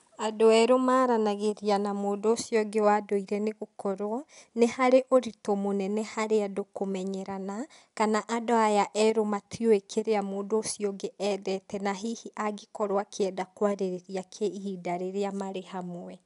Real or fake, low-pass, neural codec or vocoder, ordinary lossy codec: real; 10.8 kHz; none; none